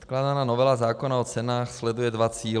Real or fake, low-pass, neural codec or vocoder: real; 9.9 kHz; none